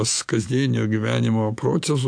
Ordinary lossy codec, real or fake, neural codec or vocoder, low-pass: AAC, 64 kbps; fake; autoencoder, 48 kHz, 128 numbers a frame, DAC-VAE, trained on Japanese speech; 9.9 kHz